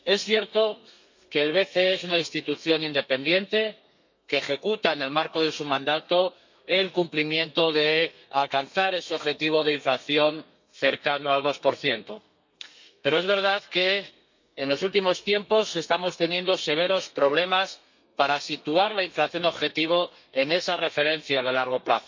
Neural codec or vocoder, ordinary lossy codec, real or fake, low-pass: codec, 32 kHz, 1.9 kbps, SNAC; MP3, 64 kbps; fake; 7.2 kHz